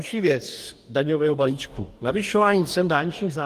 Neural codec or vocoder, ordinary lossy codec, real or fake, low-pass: codec, 44.1 kHz, 2.6 kbps, DAC; Opus, 24 kbps; fake; 14.4 kHz